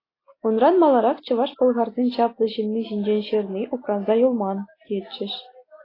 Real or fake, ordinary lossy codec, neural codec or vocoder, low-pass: real; AAC, 24 kbps; none; 5.4 kHz